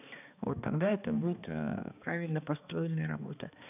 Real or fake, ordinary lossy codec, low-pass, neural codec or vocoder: fake; none; 3.6 kHz; codec, 16 kHz, 2 kbps, X-Codec, HuBERT features, trained on balanced general audio